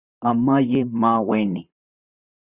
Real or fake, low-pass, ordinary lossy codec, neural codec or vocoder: fake; 3.6 kHz; Opus, 64 kbps; vocoder, 44.1 kHz, 128 mel bands, Pupu-Vocoder